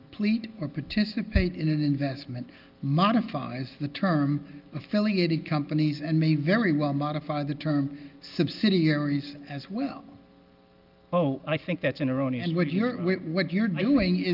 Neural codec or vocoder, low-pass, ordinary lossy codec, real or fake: none; 5.4 kHz; Opus, 32 kbps; real